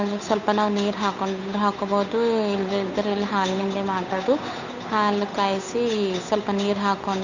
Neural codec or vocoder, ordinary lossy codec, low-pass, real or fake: codec, 16 kHz, 8 kbps, FunCodec, trained on Chinese and English, 25 frames a second; none; 7.2 kHz; fake